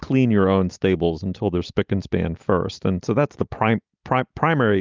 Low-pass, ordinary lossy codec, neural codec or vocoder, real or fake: 7.2 kHz; Opus, 24 kbps; none; real